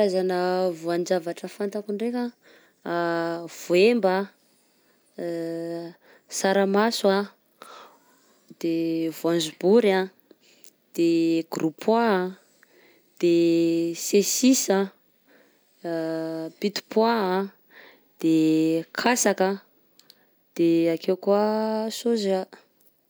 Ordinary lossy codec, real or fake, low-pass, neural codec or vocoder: none; real; none; none